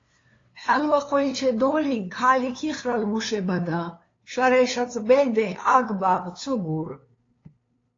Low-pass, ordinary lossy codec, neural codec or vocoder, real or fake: 7.2 kHz; AAC, 32 kbps; codec, 16 kHz, 2 kbps, FunCodec, trained on LibriTTS, 25 frames a second; fake